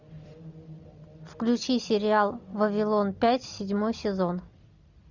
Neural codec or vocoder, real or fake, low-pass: none; real; 7.2 kHz